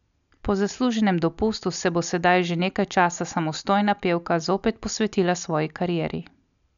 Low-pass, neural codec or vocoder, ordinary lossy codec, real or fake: 7.2 kHz; none; none; real